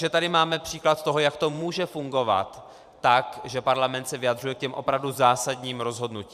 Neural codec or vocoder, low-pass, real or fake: vocoder, 44.1 kHz, 128 mel bands every 512 samples, BigVGAN v2; 14.4 kHz; fake